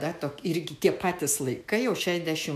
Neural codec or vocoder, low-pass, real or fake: autoencoder, 48 kHz, 128 numbers a frame, DAC-VAE, trained on Japanese speech; 14.4 kHz; fake